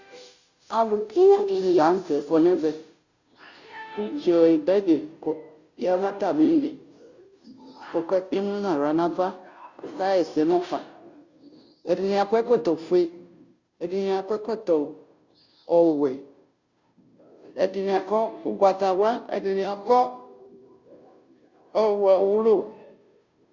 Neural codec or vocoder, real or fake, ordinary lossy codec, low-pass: codec, 16 kHz, 0.5 kbps, FunCodec, trained on Chinese and English, 25 frames a second; fake; Opus, 64 kbps; 7.2 kHz